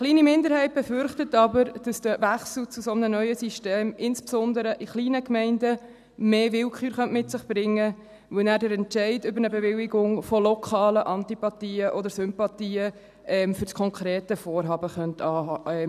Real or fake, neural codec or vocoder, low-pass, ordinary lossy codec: real; none; 14.4 kHz; none